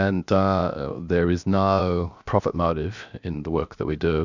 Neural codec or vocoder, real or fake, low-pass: codec, 16 kHz, 0.7 kbps, FocalCodec; fake; 7.2 kHz